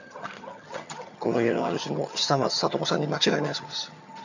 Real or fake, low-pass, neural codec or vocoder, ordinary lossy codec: fake; 7.2 kHz; vocoder, 22.05 kHz, 80 mel bands, HiFi-GAN; none